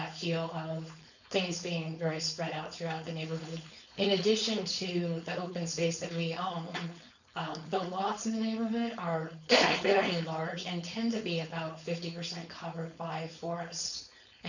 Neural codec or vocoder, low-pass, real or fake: codec, 16 kHz, 4.8 kbps, FACodec; 7.2 kHz; fake